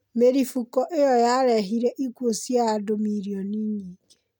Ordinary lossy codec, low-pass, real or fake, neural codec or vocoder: MP3, 96 kbps; 19.8 kHz; real; none